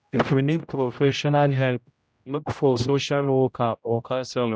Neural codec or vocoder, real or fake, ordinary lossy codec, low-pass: codec, 16 kHz, 0.5 kbps, X-Codec, HuBERT features, trained on general audio; fake; none; none